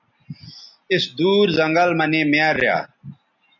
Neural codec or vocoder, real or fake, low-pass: none; real; 7.2 kHz